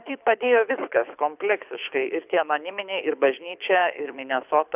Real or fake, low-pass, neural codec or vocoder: fake; 3.6 kHz; codec, 24 kHz, 6 kbps, HILCodec